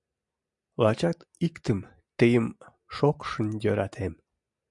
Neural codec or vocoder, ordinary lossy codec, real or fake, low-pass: none; MP3, 64 kbps; real; 10.8 kHz